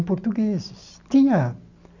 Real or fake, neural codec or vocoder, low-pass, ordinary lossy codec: real; none; 7.2 kHz; none